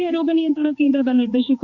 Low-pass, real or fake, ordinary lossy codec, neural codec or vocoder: 7.2 kHz; fake; Opus, 64 kbps; codec, 16 kHz, 2 kbps, X-Codec, HuBERT features, trained on general audio